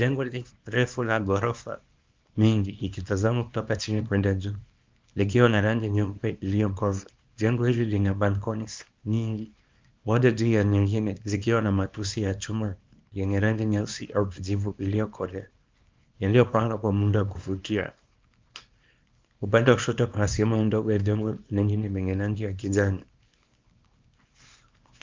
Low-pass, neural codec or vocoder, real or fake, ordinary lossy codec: 7.2 kHz; codec, 24 kHz, 0.9 kbps, WavTokenizer, small release; fake; Opus, 24 kbps